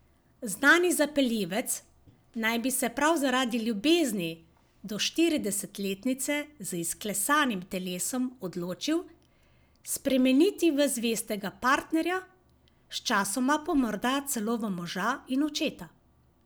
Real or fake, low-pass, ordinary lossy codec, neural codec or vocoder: real; none; none; none